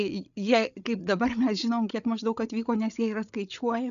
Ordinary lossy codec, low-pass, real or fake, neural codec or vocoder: MP3, 96 kbps; 7.2 kHz; fake; codec, 16 kHz, 8 kbps, FreqCodec, larger model